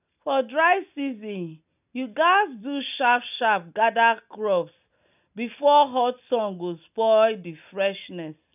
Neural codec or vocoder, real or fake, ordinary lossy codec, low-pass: none; real; none; 3.6 kHz